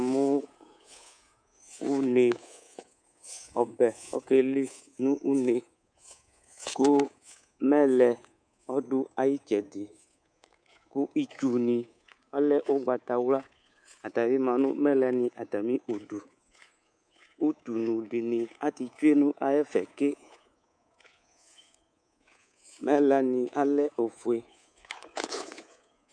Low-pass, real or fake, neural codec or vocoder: 9.9 kHz; fake; codec, 24 kHz, 3.1 kbps, DualCodec